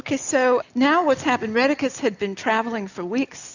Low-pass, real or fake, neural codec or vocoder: 7.2 kHz; real; none